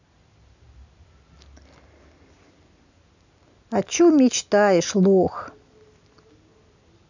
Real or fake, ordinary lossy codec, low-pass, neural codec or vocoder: real; none; 7.2 kHz; none